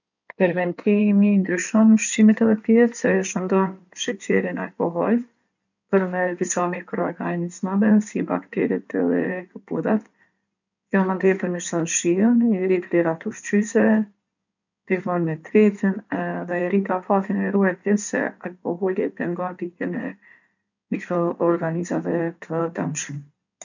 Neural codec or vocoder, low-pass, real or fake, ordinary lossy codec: codec, 16 kHz in and 24 kHz out, 2.2 kbps, FireRedTTS-2 codec; 7.2 kHz; fake; none